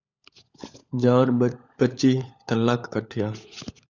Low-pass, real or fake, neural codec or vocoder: 7.2 kHz; fake; codec, 16 kHz, 16 kbps, FunCodec, trained on LibriTTS, 50 frames a second